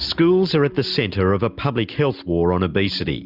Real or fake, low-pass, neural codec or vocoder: real; 5.4 kHz; none